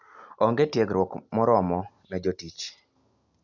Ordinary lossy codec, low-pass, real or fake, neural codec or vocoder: none; 7.2 kHz; real; none